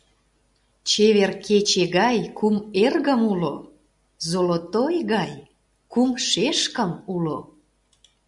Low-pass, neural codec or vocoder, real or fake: 10.8 kHz; none; real